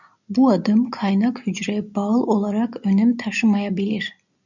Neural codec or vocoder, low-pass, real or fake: none; 7.2 kHz; real